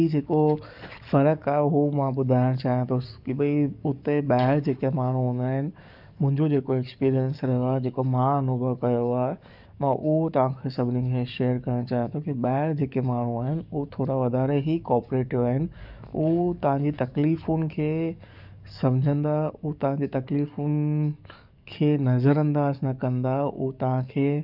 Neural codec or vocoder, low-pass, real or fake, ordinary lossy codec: codec, 44.1 kHz, 7.8 kbps, DAC; 5.4 kHz; fake; none